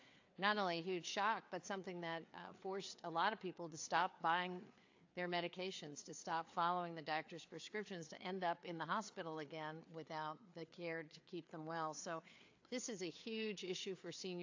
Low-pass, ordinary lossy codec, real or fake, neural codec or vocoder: 7.2 kHz; AAC, 48 kbps; fake; codec, 16 kHz, 4 kbps, FreqCodec, larger model